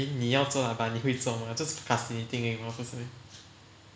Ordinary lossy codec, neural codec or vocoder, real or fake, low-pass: none; none; real; none